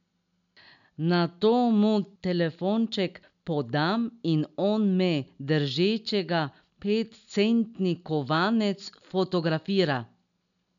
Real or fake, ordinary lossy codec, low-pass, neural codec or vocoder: real; none; 7.2 kHz; none